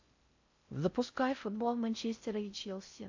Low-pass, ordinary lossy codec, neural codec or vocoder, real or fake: 7.2 kHz; AAC, 48 kbps; codec, 16 kHz in and 24 kHz out, 0.6 kbps, FocalCodec, streaming, 4096 codes; fake